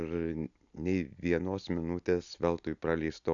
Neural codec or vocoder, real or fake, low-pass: none; real; 7.2 kHz